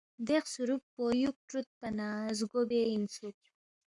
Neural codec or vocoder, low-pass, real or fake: codec, 44.1 kHz, 7.8 kbps, Pupu-Codec; 10.8 kHz; fake